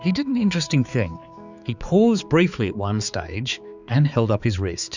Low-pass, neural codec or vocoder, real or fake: 7.2 kHz; codec, 16 kHz, 4 kbps, X-Codec, HuBERT features, trained on balanced general audio; fake